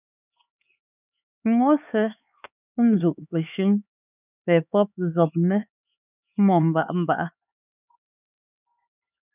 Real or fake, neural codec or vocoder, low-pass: fake; codec, 16 kHz, 4 kbps, X-Codec, HuBERT features, trained on LibriSpeech; 3.6 kHz